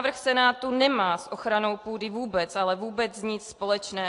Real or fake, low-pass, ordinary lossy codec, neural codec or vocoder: real; 10.8 kHz; AAC, 48 kbps; none